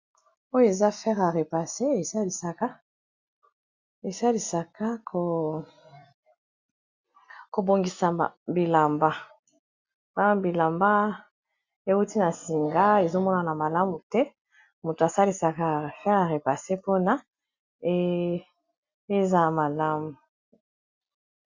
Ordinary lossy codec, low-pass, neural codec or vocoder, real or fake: Opus, 64 kbps; 7.2 kHz; none; real